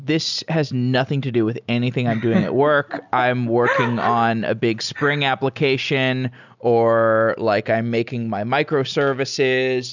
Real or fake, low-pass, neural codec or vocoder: real; 7.2 kHz; none